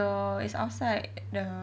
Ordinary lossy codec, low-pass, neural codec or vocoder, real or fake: none; none; none; real